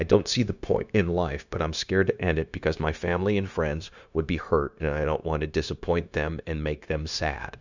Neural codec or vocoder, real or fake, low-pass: codec, 16 kHz, 0.9 kbps, LongCat-Audio-Codec; fake; 7.2 kHz